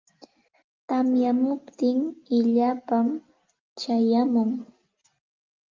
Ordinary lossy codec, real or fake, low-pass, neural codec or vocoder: Opus, 32 kbps; real; 7.2 kHz; none